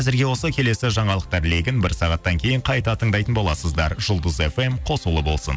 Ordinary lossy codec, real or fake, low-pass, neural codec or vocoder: none; real; none; none